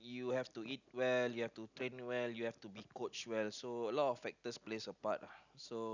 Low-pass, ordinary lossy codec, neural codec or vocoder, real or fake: 7.2 kHz; none; none; real